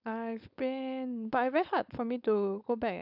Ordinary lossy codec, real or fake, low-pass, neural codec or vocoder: none; fake; 5.4 kHz; codec, 16 kHz, 4.8 kbps, FACodec